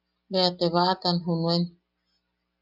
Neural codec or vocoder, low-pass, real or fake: none; 5.4 kHz; real